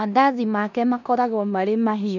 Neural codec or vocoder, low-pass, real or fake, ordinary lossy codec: codec, 16 kHz in and 24 kHz out, 0.9 kbps, LongCat-Audio-Codec, four codebook decoder; 7.2 kHz; fake; none